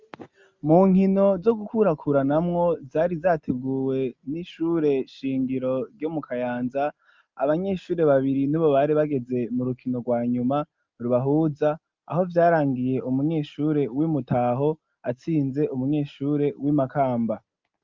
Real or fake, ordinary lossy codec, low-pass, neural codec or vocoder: real; Opus, 32 kbps; 7.2 kHz; none